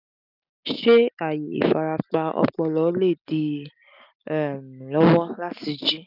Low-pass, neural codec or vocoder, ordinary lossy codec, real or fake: 5.4 kHz; none; none; real